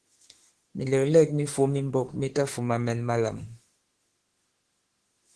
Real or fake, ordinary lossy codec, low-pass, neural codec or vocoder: fake; Opus, 16 kbps; 10.8 kHz; autoencoder, 48 kHz, 32 numbers a frame, DAC-VAE, trained on Japanese speech